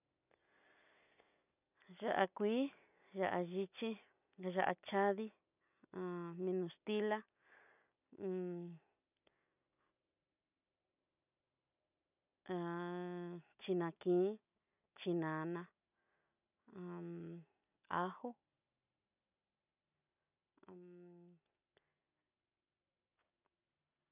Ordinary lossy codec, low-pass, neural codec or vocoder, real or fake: none; 3.6 kHz; none; real